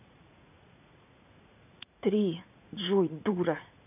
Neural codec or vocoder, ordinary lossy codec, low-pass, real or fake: vocoder, 44.1 kHz, 80 mel bands, Vocos; none; 3.6 kHz; fake